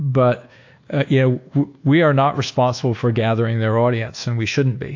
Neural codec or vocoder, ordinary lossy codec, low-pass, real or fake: codec, 24 kHz, 1.2 kbps, DualCodec; AAC, 48 kbps; 7.2 kHz; fake